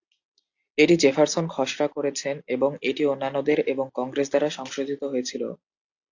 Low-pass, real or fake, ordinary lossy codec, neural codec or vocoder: 7.2 kHz; real; AAC, 48 kbps; none